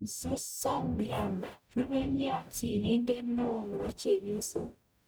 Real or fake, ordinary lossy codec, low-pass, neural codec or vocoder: fake; none; none; codec, 44.1 kHz, 0.9 kbps, DAC